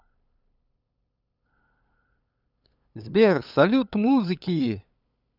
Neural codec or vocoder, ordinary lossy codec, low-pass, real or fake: codec, 16 kHz, 8 kbps, FunCodec, trained on LibriTTS, 25 frames a second; none; 5.4 kHz; fake